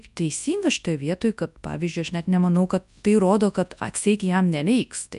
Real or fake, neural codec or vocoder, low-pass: fake; codec, 24 kHz, 0.9 kbps, WavTokenizer, large speech release; 10.8 kHz